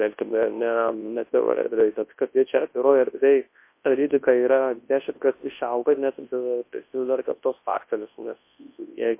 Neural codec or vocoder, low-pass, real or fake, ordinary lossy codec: codec, 24 kHz, 0.9 kbps, WavTokenizer, large speech release; 3.6 kHz; fake; MP3, 24 kbps